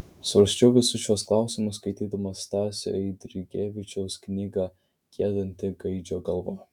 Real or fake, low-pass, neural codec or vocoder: fake; 19.8 kHz; autoencoder, 48 kHz, 128 numbers a frame, DAC-VAE, trained on Japanese speech